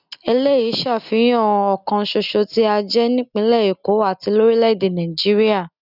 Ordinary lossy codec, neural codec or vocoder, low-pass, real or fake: none; none; 5.4 kHz; real